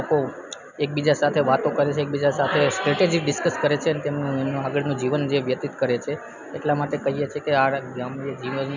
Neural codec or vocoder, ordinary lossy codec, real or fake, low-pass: none; none; real; 7.2 kHz